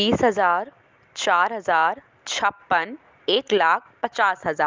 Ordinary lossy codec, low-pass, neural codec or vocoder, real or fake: Opus, 32 kbps; 7.2 kHz; none; real